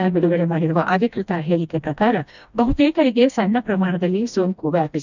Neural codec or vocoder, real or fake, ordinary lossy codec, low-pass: codec, 16 kHz, 1 kbps, FreqCodec, smaller model; fake; none; 7.2 kHz